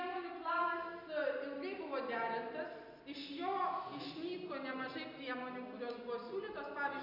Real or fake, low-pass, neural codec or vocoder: real; 5.4 kHz; none